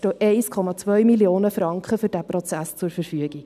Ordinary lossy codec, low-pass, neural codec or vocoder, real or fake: none; 14.4 kHz; none; real